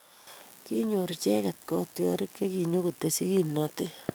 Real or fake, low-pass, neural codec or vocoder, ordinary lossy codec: fake; none; codec, 44.1 kHz, 7.8 kbps, DAC; none